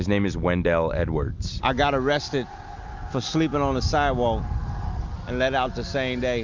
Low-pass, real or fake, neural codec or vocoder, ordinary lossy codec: 7.2 kHz; real; none; MP3, 64 kbps